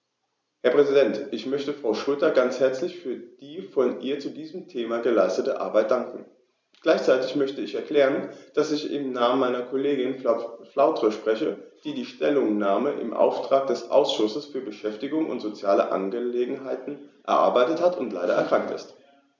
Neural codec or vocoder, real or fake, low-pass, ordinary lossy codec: none; real; none; none